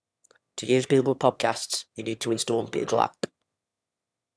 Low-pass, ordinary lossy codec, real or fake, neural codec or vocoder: none; none; fake; autoencoder, 22.05 kHz, a latent of 192 numbers a frame, VITS, trained on one speaker